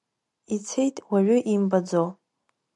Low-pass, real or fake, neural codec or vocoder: 10.8 kHz; real; none